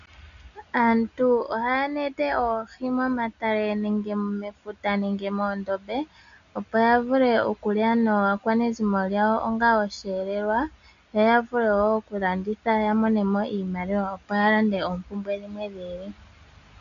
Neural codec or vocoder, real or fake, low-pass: none; real; 7.2 kHz